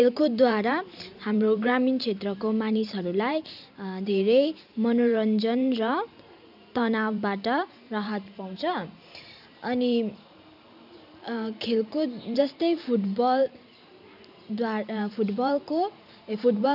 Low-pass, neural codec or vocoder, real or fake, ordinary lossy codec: 5.4 kHz; vocoder, 44.1 kHz, 128 mel bands every 512 samples, BigVGAN v2; fake; none